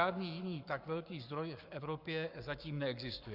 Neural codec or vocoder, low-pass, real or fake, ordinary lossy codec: codec, 44.1 kHz, 7.8 kbps, Pupu-Codec; 5.4 kHz; fake; Opus, 64 kbps